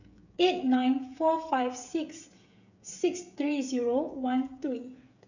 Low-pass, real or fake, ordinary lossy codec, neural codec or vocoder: 7.2 kHz; fake; none; codec, 16 kHz, 8 kbps, FreqCodec, smaller model